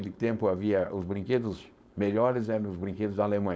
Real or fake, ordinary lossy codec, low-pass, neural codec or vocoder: fake; none; none; codec, 16 kHz, 4.8 kbps, FACodec